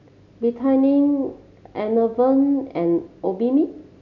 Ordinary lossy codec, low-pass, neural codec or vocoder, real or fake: none; 7.2 kHz; none; real